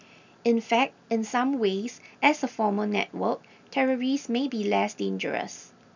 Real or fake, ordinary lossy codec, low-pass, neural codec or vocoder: real; none; 7.2 kHz; none